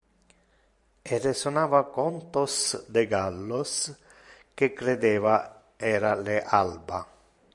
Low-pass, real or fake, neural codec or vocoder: 10.8 kHz; fake; vocoder, 44.1 kHz, 128 mel bands every 512 samples, BigVGAN v2